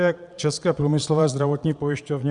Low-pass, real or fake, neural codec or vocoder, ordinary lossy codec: 9.9 kHz; fake; vocoder, 22.05 kHz, 80 mel bands, Vocos; Opus, 64 kbps